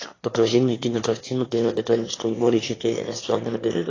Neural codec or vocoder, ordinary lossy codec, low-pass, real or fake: autoencoder, 22.05 kHz, a latent of 192 numbers a frame, VITS, trained on one speaker; AAC, 32 kbps; 7.2 kHz; fake